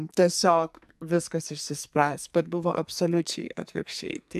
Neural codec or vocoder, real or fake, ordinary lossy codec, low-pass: codec, 44.1 kHz, 2.6 kbps, SNAC; fake; AAC, 96 kbps; 14.4 kHz